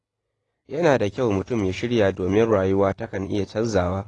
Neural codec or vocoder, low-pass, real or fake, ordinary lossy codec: none; 10.8 kHz; real; AAC, 32 kbps